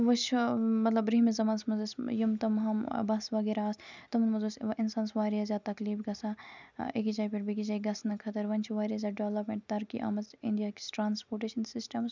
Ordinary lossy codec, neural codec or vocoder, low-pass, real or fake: none; none; 7.2 kHz; real